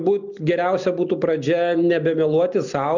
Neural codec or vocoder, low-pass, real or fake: none; 7.2 kHz; real